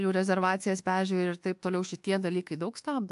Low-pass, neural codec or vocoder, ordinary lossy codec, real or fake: 10.8 kHz; codec, 24 kHz, 1.2 kbps, DualCodec; AAC, 64 kbps; fake